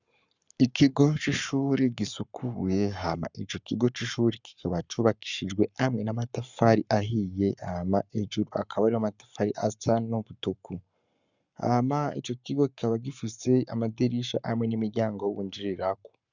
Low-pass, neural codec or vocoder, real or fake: 7.2 kHz; codec, 44.1 kHz, 7.8 kbps, Pupu-Codec; fake